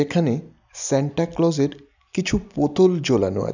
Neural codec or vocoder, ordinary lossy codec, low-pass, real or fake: none; none; 7.2 kHz; real